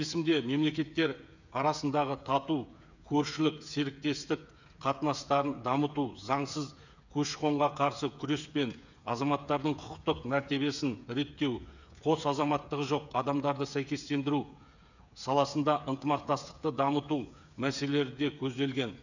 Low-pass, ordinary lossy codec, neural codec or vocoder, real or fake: 7.2 kHz; none; codec, 16 kHz, 8 kbps, FreqCodec, smaller model; fake